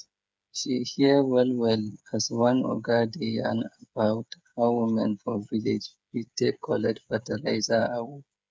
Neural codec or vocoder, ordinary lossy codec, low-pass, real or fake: codec, 16 kHz, 8 kbps, FreqCodec, smaller model; none; none; fake